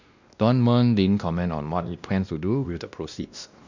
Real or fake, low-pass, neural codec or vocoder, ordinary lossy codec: fake; 7.2 kHz; codec, 16 kHz, 1 kbps, X-Codec, WavLM features, trained on Multilingual LibriSpeech; none